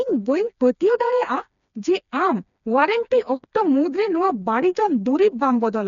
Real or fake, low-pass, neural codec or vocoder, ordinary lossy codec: fake; 7.2 kHz; codec, 16 kHz, 2 kbps, FreqCodec, smaller model; none